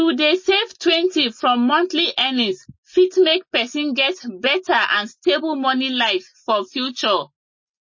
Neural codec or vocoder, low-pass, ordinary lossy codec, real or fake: none; 7.2 kHz; MP3, 32 kbps; real